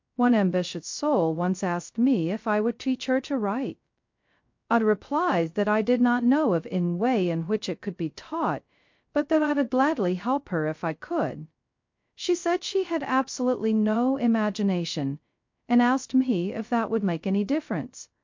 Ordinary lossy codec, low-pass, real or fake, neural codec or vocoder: MP3, 48 kbps; 7.2 kHz; fake; codec, 16 kHz, 0.2 kbps, FocalCodec